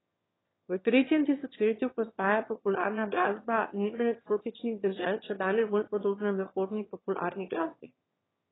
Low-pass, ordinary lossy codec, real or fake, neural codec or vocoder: 7.2 kHz; AAC, 16 kbps; fake; autoencoder, 22.05 kHz, a latent of 192 numbers a frame, VITS, trained on one speaker